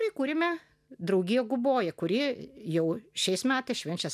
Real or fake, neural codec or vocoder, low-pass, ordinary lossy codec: real; none; 14.4 kHz; MP3, 96 kbps